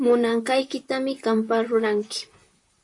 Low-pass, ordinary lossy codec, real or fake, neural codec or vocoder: 10.8 kHz; MP3, 64 kbps; fake; vocoder, 44.1 kHz, 128 mel bands, Pupu-Vocoder